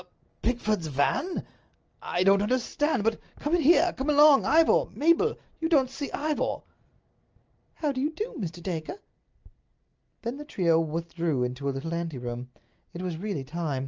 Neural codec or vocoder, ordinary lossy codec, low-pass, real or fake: none; Opus, 24 kbps; 7.2 kHz; real